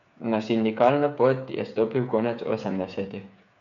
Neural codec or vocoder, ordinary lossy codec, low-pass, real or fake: codec, 16 kHz, 8 kbps, FreqCodec, smaller model; none; 7.2 kHz; fake